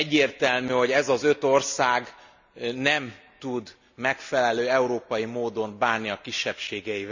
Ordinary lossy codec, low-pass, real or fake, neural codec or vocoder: none; 7.2 kHz; real; none